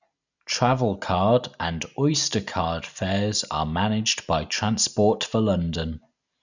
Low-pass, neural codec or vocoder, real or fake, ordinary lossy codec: 7.2 kHz; none; real; none